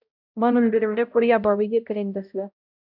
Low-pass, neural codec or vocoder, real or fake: 5.4 kHz; codec, 16 kHz, 0.5 kbps, X-Codec, HuBERT features, trained on balanced general audio; fake